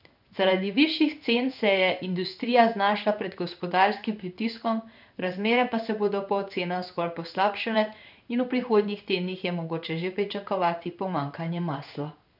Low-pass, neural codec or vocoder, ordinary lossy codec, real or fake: 5.4 kHz; codec, 16 kHz in and 24 kHz out, 1 kbps, XY-Tokenizer; none; fake